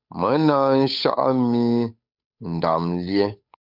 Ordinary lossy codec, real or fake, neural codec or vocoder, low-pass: MP3, 48 kbps; fake; codec, 16 kHz, 8 kbps, FunCodec, trained on Chinese and English, 25 frames a second; 5.4 kHz